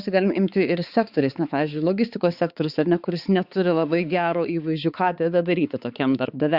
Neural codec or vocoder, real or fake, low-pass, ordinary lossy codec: codec, 16 kHz, 4 kbps, X-Codec, HuBERT features, trained on balanced general audio; fake; 5.4 kHz; Opus, 64 kbps